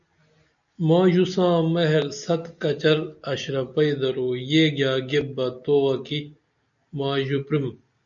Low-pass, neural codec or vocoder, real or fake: 7.2 kHz; none; real